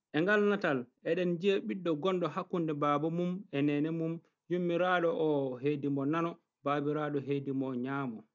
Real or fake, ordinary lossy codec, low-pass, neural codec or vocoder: real; none; 7.2 kHz; none